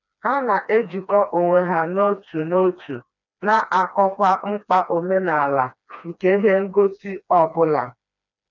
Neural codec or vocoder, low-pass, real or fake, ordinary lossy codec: codec, 16 kHz, 2 kbps, FreqCodec, smaller model; 7.2 kHz; fake; none